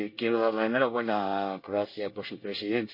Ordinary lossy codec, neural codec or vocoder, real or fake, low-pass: MP3, 32 kbps; codec, 24 kHz, 1 kbps, SNAC; fake; 5.4 kHz